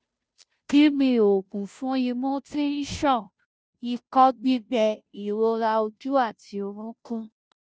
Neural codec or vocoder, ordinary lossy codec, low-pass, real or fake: codec, 16 kHz, 0.5 kbps, FunCodec, trained on Chinese and English, 25 frames a second; none; none; fake